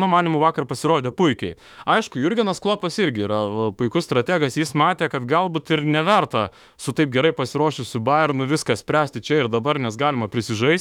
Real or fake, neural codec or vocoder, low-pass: fake; autoencoder, 48 kHz, 32 numbers a frame, DAC-VAE, trained on Japanese speech; 19.8 kHz